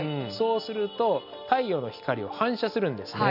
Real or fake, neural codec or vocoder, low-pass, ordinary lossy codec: real; none; 5.4 kHz; none